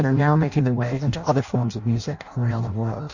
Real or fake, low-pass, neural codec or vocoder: fake; 7.2 kHz; codec, 16 kHz in and 24 kHz out, 0.6 kbps, FireRedTTS-2 codec